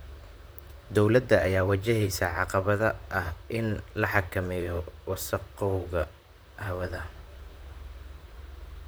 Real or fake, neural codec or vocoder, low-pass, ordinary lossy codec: fake; vocoder, 44.1 kHz, 128 mel bands, Pupu-Vocoder; none; none